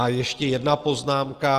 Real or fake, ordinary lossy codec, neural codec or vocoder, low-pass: real; Opus, 24 kbps; none; 14.4 kHz